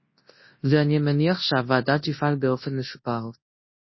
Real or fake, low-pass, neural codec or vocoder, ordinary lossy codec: fake; 7.2 kHz; codec, 24 kHz, 0.9 kbps, WavTokenizer, large speech release; MP3, 24 kbps